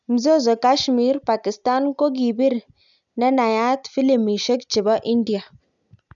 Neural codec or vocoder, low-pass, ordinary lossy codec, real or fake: none; 7.2 kHz; none; real